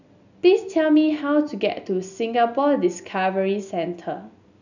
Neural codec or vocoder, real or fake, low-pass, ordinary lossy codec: none; real; 7.2 kHz; none